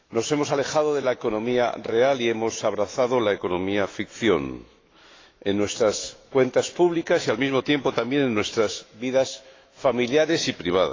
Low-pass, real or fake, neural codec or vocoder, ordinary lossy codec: 7.2 kHz; fake; autoencoder, 48 kHz, 128 numbers a frame, DAC-VAE, trained on Japanese speech; AAC, 32 kbps